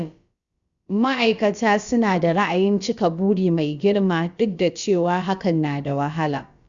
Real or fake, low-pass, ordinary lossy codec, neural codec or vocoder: fake; 7.2 kHz; Opus, 64 kbps; codec, 16 kHz, about 1 kbps, DyCAST, with the encoder's durations